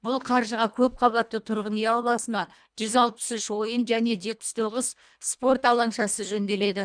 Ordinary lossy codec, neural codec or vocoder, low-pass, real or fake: none; codec, 24 kHz, 1.5 kbps, HILCodec; 9.9 kHz; fake